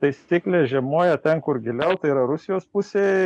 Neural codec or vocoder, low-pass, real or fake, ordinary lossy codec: none; 10.8 kHz; real; MP3, 96 kbps